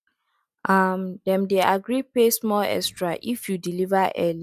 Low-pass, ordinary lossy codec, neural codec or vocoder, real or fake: 14.4 kHz; none; none; real